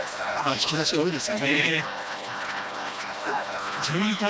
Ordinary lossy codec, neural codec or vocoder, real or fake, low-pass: none; codec, 16 kHz, 1 kbps, FreqCodec, smaller model; fake; none